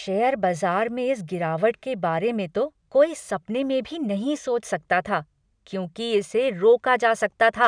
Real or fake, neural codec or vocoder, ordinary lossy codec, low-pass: real; none; none; 9.9 kHz